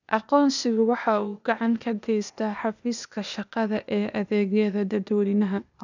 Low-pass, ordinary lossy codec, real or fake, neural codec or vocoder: 7.2 kHz; none; fake; codec, 16 kHz, 0.8 kbps, ZipCodec